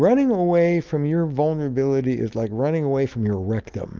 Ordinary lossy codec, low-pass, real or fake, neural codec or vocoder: Opus, 32 kbps; 7.2 kHz; real; none